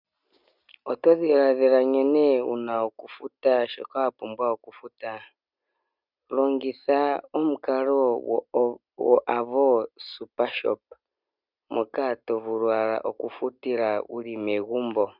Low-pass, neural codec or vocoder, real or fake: 5.4 kHz; none; real